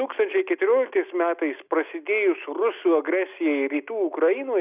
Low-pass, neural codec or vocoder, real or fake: 3.6 kHz; none; real